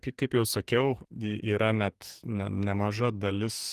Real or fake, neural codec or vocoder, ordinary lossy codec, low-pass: fake; codec, 44.1 kHz, 2.6 kbps, SNAC; Opus, 24 kbps; 14.4 kHz